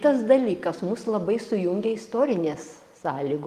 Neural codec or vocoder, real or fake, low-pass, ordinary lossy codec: none; real; 14.4 kHz; Opus, 24 kbps